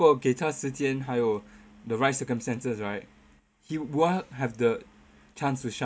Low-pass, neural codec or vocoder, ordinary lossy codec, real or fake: none; none; none; real